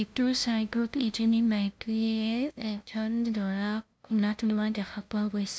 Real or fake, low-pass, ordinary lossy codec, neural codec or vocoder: fake; none; none; codec, 16 kHz, 0.5 kbps, FunCodec, trained on LibriTTS, 25 frames a second